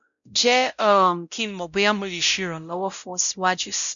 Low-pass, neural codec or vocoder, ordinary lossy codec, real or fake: 7.2 kHz; codec, 16 kHz, 0.5 kbps, X-Codec, WavLM features, trained on Multilingual LibriSpeech; none; fake